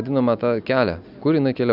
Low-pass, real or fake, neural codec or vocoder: 5.4 kHz; real; none